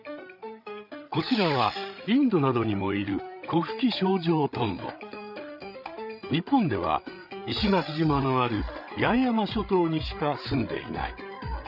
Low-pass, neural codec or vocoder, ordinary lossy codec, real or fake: 5.4 kHz; codec, 16 kHz, 8 kbps, FreqCodec, larger model; none; fake